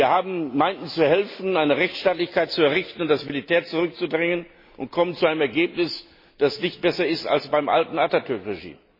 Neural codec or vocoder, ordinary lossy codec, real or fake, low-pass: none; MP3, 24 kbps; real; 5.4 kHz